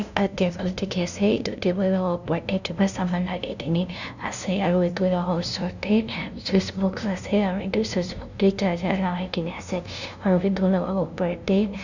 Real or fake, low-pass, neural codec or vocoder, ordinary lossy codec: fake; 7.2 kHz; codec, 16 kHz, 0.5 kbps, FunCodec, trained on LibriTTS, 25 frames a second; none